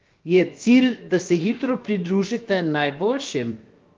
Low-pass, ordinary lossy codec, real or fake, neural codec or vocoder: 7.2 kHz; Opus, 32 kbps; fake; codec, 16 kHz, 0.7 kbps, FocalCodec